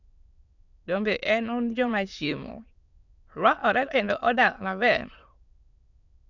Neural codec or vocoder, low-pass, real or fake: autoencoder, 22.05 kHz, a latent of 192 numbers a frame, VITS, trained on many speakers; 7.2 kHz; fake